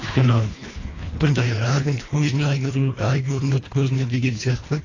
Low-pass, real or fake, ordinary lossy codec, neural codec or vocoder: 7.2 kHz; fake; AAC, 32 kbps; codec, 24 kHz, 1.5 kbps, HILCodec